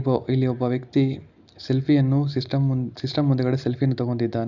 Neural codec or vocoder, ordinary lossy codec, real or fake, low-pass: none; none; real; 7.2 kHz